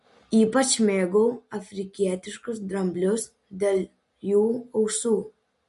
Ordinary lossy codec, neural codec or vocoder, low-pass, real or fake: MP3, 48 kbps; none; 14.4 kHz; real